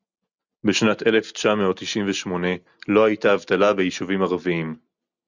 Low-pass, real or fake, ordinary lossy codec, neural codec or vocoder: 7.2 kHz; real; Opus, 64 kbps; none